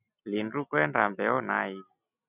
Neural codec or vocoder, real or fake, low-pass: none; real; 3.6 kHz